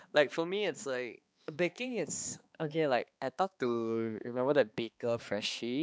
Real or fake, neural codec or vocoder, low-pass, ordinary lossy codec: fake; codec, 16 kHz, 2 kbps, X-Codec, HuBERT features, trained on balanced general audio; none; none